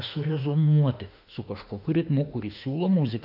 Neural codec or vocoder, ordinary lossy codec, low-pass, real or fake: autoencoder, 48 kHz, 32 numbers a frame, DAC-VAE, trained on Japanese speech; AAC, 48 kbps; 5.4 kHz; fake